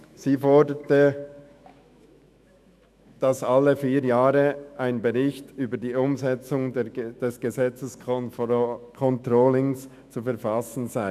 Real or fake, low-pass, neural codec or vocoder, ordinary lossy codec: fake; 14.4 kHz; autoencoder, 48 kHz, 128 numbers a frame, DAC-VAE, trained on Japanese speech; none